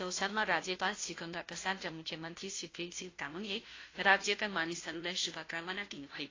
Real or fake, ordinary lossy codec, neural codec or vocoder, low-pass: fake; AAC, 32 kbps; codec, 16 kHz, 0.5 kbps, FunCodec, trained on Chinese and English, 25 frames a second; 7.2 kHz